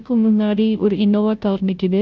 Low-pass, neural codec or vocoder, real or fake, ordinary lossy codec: none; codec, 16 kHz, 0.5 kbps, FunCodec, trained on Chinese and English, 25 frames a second; fake; none